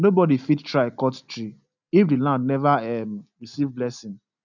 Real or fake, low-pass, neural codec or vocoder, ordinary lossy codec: real; 7.2 kHz; none; none